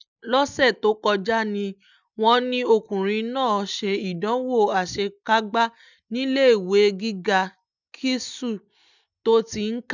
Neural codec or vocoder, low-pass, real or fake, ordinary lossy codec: none; 7.2 kHz; real; none